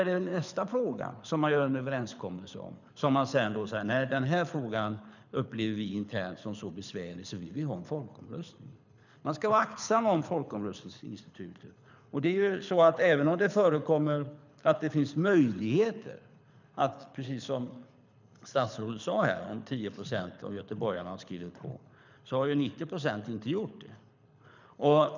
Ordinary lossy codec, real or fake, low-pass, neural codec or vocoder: none; fake; 7.2 kHz; codec, 24 kHz, 6 kbps, HILCodec